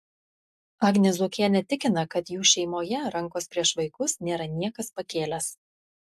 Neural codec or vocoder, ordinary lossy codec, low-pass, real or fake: none; AAC, 96 kbps; 14.4 kHz; real